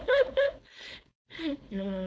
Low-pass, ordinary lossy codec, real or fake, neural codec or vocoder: none; none; fake; codec, 16 kHz, 4.8 kbps, FACodec